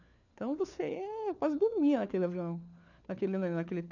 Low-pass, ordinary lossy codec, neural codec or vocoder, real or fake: 7.2 kHz; none; codec, 16 kHz, 4 kbps, FunCodec, trained on LibriTTS, 50 frames a second; fake